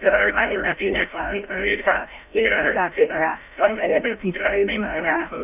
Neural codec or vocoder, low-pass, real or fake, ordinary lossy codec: codec, 16 kHz, 0.5 kbps, FreqCodec, larger model; 3.6 kHz; fake; none